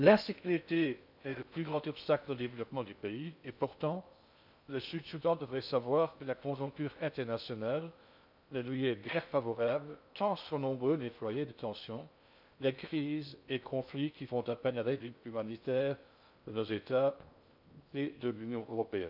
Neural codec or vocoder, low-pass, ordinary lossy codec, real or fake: codec, 16 kHz in and 24 kHz out, 0.6 kbps, FocalCodec, streaming, 2048 codes; 5.4 kHz; none; fake